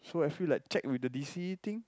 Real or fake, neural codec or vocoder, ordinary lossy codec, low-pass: real; none; none; none